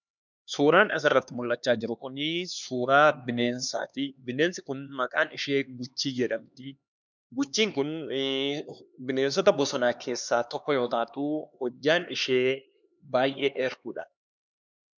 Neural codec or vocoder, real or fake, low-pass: codec, 16 kHz, 2 kbps, X-Codec, HuBERT features, trained on LibriSpeech; fake; 7.2 kHz